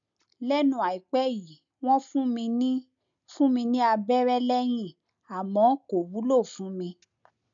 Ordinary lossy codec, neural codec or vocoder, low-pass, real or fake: none; none; 7.2 kHz; real